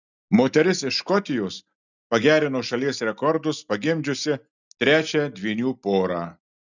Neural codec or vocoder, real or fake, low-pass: none; real; 7.2 kHz